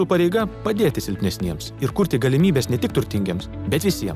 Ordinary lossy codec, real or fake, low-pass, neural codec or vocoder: Opus, 64 kbps; real; 14.4 kHz; none